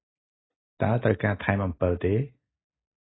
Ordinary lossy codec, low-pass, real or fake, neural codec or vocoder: AAC, 16 kbps; 7.2 kHz; real; none